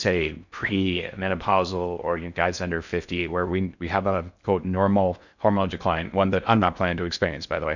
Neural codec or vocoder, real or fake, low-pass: codec, 16 kHz in and 24 kHz out, 0.6 kbps, FocalCodec, streaming, 4096 codes; fake; 7.2 kHz